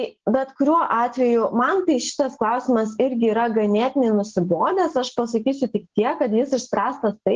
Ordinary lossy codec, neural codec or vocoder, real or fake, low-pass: Opus, 16 kbps; none; real; 7.2 kHz